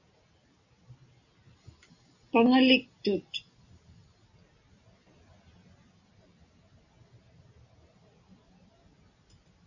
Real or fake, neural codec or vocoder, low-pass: real; none; 7.2 kHz